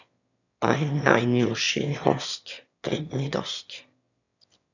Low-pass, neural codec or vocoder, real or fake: 7.2 kHz; autoencoder, 22.05 kHz, a latent of 192 numbers a frame, VITS, trained on one speaker; fake